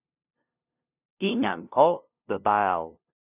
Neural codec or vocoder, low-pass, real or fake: codec, 16 kHz, 0.5 kbps, FunCodec, trained on LibriTTS, 25 frames a second; 3.6 kHz; fake